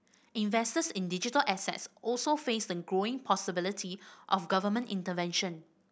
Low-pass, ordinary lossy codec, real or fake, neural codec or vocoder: none; none; real; none